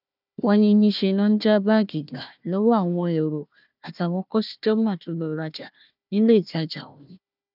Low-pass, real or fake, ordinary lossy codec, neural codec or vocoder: 5.4 kHz; fake; none; codec, 16 kHz, 1 kbps, FunCodec, trained on Chinese and English, 50 frames a second